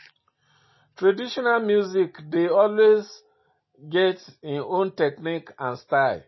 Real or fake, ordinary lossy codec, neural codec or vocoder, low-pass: real; MP3, 24 kbps; none; 7.2 kHz